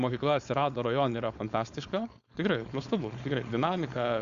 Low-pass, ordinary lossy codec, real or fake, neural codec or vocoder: 7.2 kHz; Opus, 64 kbps; fake; codec, 16 kHz, 4.8 kbps, FACodec